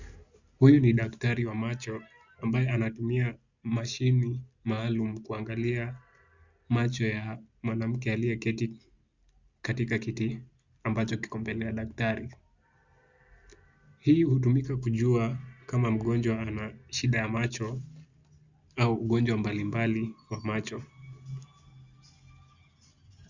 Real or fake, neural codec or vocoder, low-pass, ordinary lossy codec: real; none; 7.2 kHz; Opus, 64 kbps